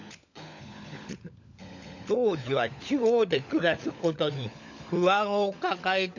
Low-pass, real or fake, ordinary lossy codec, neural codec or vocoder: 7.2 kHz; fake; none; codec, 16 kHz, 16 kbps, FunCodec, trained on LibriTTS, 50 frames a second